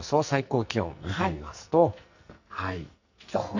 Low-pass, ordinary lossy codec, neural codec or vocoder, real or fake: 7.2 kHz; none; codec, 44.1 kHz, 2.6 kbps, SNAC; fake